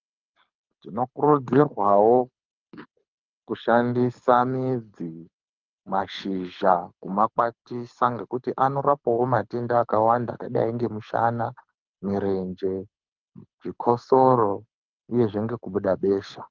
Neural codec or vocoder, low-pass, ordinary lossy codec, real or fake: codec, 24 kHz, 6 kbps, HILCodec; 7.2 kHz; Opus, 24 kbps; fake